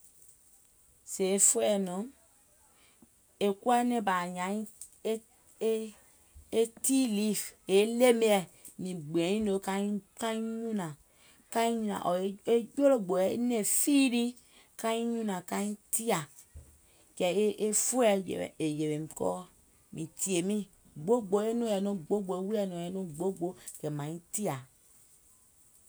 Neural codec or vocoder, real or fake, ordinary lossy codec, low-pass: none; real; none; none